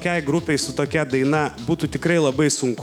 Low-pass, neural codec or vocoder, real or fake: 19.8 kHz; autoencoder, 48 kHz, 128 numbers a frame, DAC-VAE, trained on Japanese speech; fake